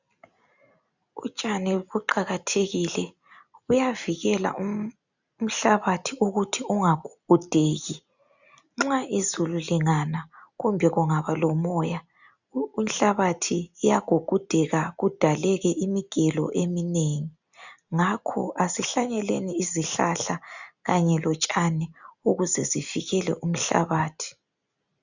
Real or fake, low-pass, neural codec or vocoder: real; 7.2 kHz; none